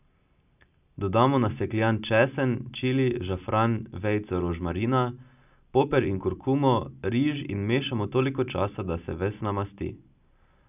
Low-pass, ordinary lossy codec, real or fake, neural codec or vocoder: 3.6 kHz; none; real; none